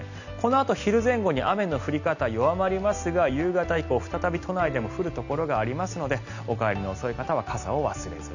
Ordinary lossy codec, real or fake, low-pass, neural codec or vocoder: none; real; 7.2 kHz; none